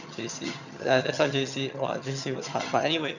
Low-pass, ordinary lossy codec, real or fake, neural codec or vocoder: 7.2 kHz; none; fake; vocoder, 22.05 kHz, 80 mel bands, HiFi-GAN